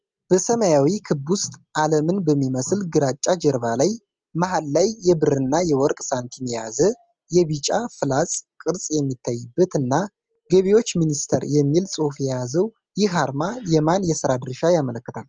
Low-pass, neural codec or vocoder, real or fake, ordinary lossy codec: 7.2 kHz; none; real; Opus, 24 kbps